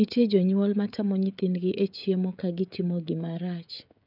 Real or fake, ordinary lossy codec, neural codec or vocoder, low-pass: fake; none; codec, 16 kHz, 16 kbps, FreqCodec, smaller model; 5.4 kHz